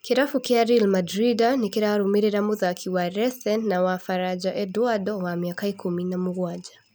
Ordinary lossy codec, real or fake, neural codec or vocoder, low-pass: none; real; none; none